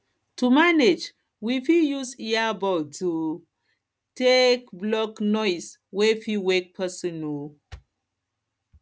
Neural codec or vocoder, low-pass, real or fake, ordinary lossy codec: none; none; real; none